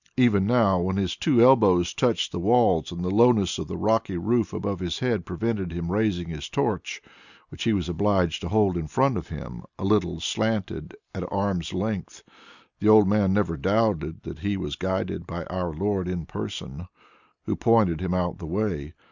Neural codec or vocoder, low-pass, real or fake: none; 7.2 kHz; real